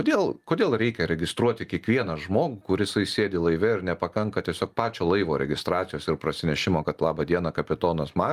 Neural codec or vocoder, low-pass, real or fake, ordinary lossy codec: none; 14.4 kHz; real; Opus, 32 kbps